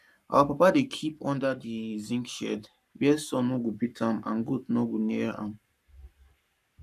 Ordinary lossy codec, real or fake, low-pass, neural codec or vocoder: none; fake; 14.4 kHz; codec, 44.1 kHz, 7.8 kbps, Pupu-Codec